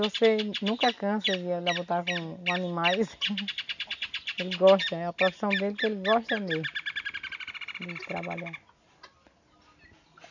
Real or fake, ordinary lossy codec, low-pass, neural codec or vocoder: real; none; 7.2 kHz; none